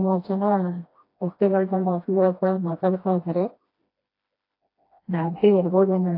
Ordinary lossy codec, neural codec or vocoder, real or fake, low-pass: AAC, 32 kbps; codec, 16 kHz, 1 kbps, FreqCodec, smaller model; fake; 5.4 kHz